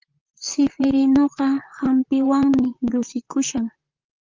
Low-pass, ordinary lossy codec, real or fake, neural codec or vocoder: 7.2 kHz; Opus, 24 kbps; fake; vocoder, 44.1 kHz, 128 mel bands, Pupu-Vocoder